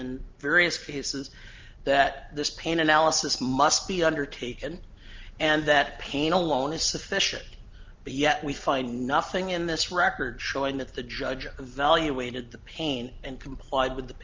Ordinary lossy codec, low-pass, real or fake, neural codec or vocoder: Opus, 24 kbps; 7.2 kHz; real; none